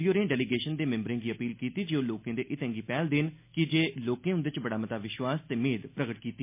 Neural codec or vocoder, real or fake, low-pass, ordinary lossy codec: none; real; 3.6 kHz; MP3, 24 kbps